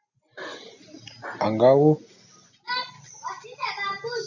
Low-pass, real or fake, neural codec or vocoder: 7.2 kHz; real; none